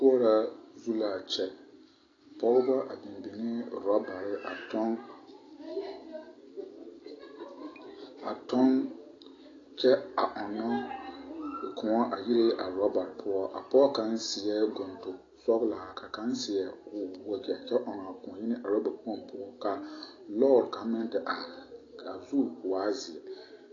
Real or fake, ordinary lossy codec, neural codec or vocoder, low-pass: real; AAC, 32 kbps; none; 7.2 kHz